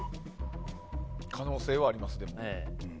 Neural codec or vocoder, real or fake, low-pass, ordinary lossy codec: none; real; none; none